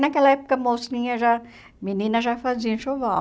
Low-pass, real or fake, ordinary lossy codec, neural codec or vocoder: none; real; none; none